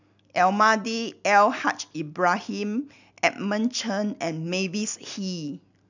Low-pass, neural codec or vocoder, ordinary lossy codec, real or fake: 7.2 kHz; none; none; real